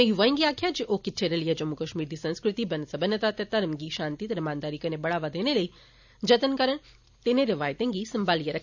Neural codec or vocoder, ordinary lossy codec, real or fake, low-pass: none; none; real; 7.2 kHz